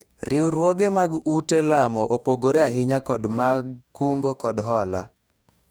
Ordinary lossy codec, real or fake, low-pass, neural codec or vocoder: none; fake; none; codec, 44.1 kHz, 2.6 kbps, DAC